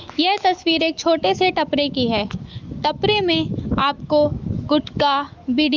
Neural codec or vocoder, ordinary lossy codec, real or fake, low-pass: autoencoder, 48 kHz, 128 numbers a frame, DAC-VAE, trained on Japanese speech; Opus, 32 kbps; fake; 7.2 kHz